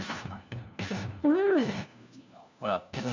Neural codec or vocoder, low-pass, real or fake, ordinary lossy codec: codec, 16 kHz, 1 kbps, FunCodec, trained on LibriTTS, 50 frames a second; 7.2 kHz; fake; none